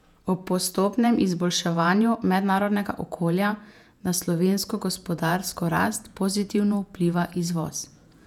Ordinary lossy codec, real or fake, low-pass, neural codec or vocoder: none; fake; 19.8 kHz; vocoder, 48 kHz, 128 mel bands, Vocos